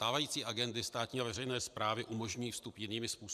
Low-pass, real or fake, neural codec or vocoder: 14.4 kHz; fake; vocoder, 44.1 kHz, 128 mel bands every 512 samples, BigVGAN v2